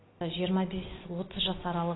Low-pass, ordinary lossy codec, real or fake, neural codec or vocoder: 7.2 kHz; AAC, 16 kbps; real; none